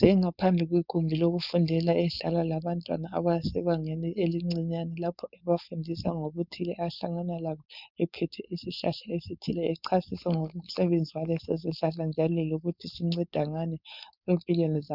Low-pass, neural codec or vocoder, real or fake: 5.4 kHz; codec, 16 kHz, 4.8 kbps, FACodec; fake